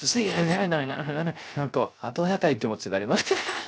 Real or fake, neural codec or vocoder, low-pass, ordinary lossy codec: fake; codec, 16 kHz, 0.3 kbps, FocalCodec; none; none